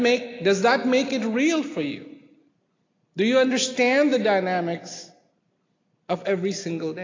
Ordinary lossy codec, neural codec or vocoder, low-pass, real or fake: AAC, 32 kbps; none; 7.2 kHz; real